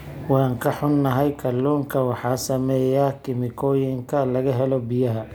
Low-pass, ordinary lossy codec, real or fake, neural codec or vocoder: none; none; real; none